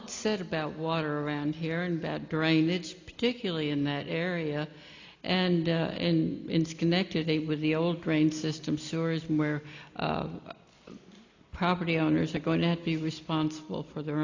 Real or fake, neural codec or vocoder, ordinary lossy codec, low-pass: real; none; AAC, 48 kbps; 7.2 kHz